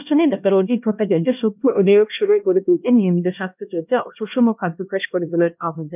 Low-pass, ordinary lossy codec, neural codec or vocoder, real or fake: 3.6 kHz; none; codec, 16 kHz, 1 kbps, X-Codec, HuBERT features, trained on LibriSpeech; fake